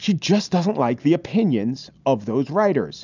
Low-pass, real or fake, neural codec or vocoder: 7.2 kHz; real; none